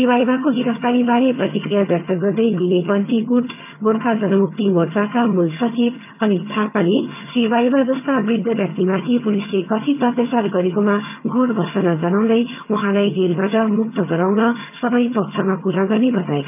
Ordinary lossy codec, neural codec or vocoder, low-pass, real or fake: none; vocoder, 22.05 kHz, 80 mel bands, HiFi-GAN; 3.6 kHz; fake